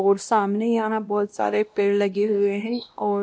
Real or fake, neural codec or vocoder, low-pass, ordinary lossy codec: fake; codec, 16 kHz, 1 kbps, X-Codec, WavLM features, trained on Multilingual LibriSpeech; none; none